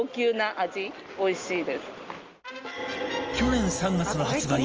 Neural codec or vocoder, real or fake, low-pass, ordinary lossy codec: none; real; 7.2 kHz; Opus, 24 kbps